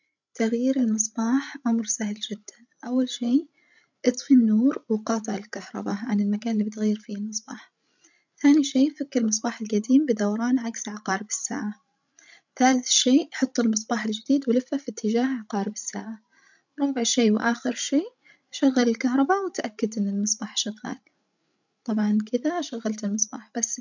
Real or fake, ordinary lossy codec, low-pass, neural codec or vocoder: fake; none; 7.2 kHz; codec, 16 kHz, 16 kbps, FreqCodec, larger model